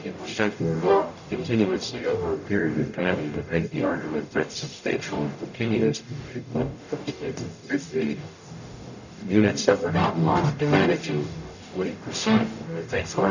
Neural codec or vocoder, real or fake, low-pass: codec, 44.1 kHz, 0.9 kbps, DAC; fake; 7.2 kHz